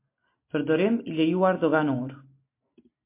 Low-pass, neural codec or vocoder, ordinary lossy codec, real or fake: 3.6 kHz; none; MP3, 24 kbps; real